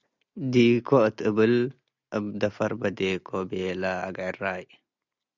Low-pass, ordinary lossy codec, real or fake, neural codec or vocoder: 7.2 kHz; Opus, 64 kbps; real; none